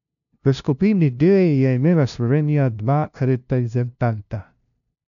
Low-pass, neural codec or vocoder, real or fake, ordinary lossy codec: 7.2 kHz; codec, 16 kHz, 0.5 kbps, FunCodec, trained on LibriTTS, 25 frames a second; fake; none